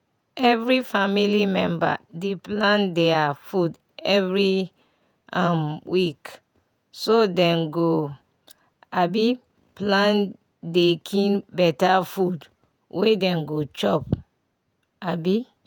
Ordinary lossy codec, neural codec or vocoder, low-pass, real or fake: none; vocoder, 48 kHz, 128 mel bands, Vocos; none; fake